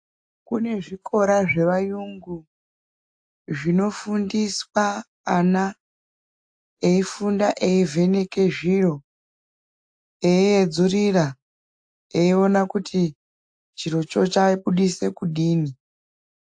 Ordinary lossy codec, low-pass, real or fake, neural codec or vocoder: AAC, 64 kbps; 9.9 kHz; real; none